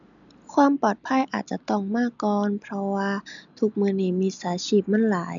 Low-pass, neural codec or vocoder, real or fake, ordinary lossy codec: 7.2 kHz; none; real; none